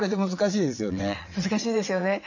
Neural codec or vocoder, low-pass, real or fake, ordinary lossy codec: vocoder, 22.05 kHz, 80 mel bands, WaveNeXt; 7.2 kHz; fake; none